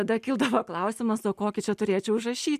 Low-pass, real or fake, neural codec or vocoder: 14.4 kHz; real; none